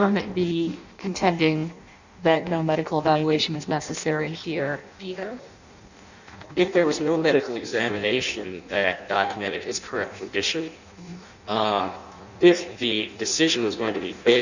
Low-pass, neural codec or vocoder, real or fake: 7.2 kHz; codec, 16 kHz in and 24 kHz out, 0.6 kbps, FireRedTTS-2 codec; fake